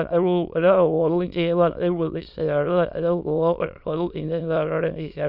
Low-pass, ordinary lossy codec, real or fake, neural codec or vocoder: 5.4 kHz; none; fake; autoencoder, 22.05 kHz, a latent of 192 numbers a frame, VITS, trained on many speakers